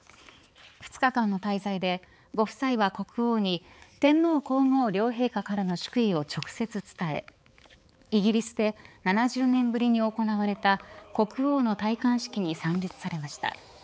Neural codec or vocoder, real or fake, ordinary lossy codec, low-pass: codec, 16 kHz, 4 kbps, X-Codec, HuBERT features, trained on balanced general audio; fake; none; none